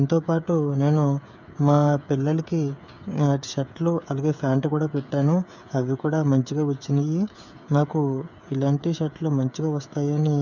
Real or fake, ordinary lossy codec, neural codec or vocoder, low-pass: fake; none; codec, 16 kHz, 8 kbps, FreqCodec, smaller model; 7.2 kHz